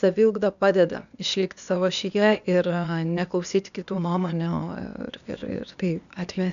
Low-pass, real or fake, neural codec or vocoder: 7.2 kHz; fake; codec, 16 kHz, 0.8 kbps, ZipCodec